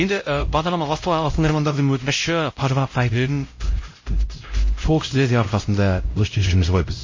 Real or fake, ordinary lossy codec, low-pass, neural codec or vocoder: fake; MP3, 32 kbps; 7.2 kHz; codec, 16 kHz, 0.5 kbps, X-Codec, HuBERT features, trained on LibriSpeech